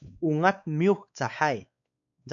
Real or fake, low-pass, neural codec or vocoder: fake; 7.2 kHz; codec, 16 kHz, 4 kbps, X-Codec, WavLM features, trained on Multilingual LibriSpeech